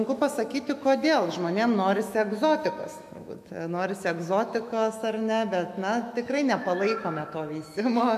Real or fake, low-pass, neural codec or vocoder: fake; 14.4 kHz; autoencoder, 48 kHz, 128 numbers a frame, DAC-VAE, trained on Japanese speech